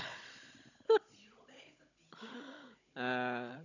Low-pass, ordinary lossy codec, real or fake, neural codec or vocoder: 7.2 kHz; none; fake; codec, 16 kHz, 16 kbps, FunCodec, trained on Chinese and English, 50 frames a second